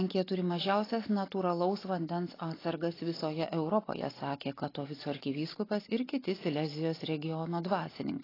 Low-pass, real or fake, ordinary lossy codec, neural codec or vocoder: 5.4 kHz; real; AAC, 24 kbps; none